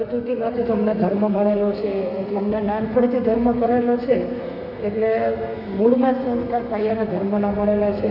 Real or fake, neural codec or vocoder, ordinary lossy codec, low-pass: fake; codec, 32 kHz, 1.9 kbps, SNAC; none; 5.4 kHz